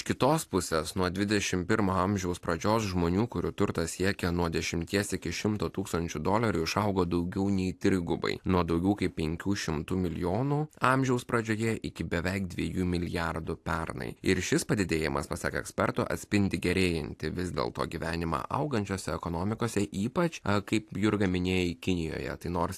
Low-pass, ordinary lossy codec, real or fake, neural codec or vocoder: 14.4 kHz; AAC, 64 kbps; real; none